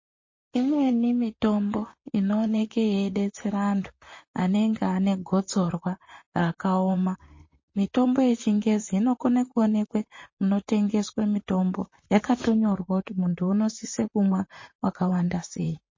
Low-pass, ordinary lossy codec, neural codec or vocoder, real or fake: 7.2 kHz; MP3, 32 kbps; vocoder, 44.1 kHz, 128 mel bands, Pupu-Vocoder; fake